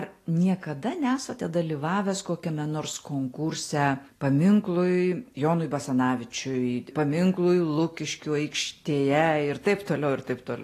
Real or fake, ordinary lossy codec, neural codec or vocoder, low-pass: real; AAC, 48 kbps; none; 14.4 kHz